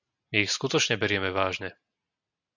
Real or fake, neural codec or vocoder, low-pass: real; none; 7.2 kHz